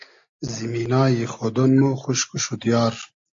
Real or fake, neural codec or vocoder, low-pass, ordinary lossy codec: real; none; 7.2 kHz; AAC, 64 kbps